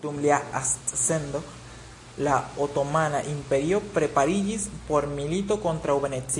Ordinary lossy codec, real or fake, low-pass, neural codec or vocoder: AAC, 48 kbps; real; 10.8 kHz; none